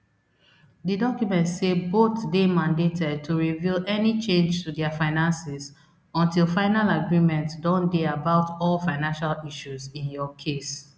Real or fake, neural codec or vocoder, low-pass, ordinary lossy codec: real; none; none; none